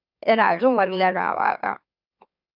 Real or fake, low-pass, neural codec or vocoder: fake; 5.4 kHz; autoencoder, 44.1 kHz, a latent of 192 numbers a frame, MeloTTS